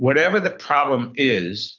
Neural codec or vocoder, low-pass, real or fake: codec, 24 kHz, 6 kbps, HILCodec; 7.2 kHz; fake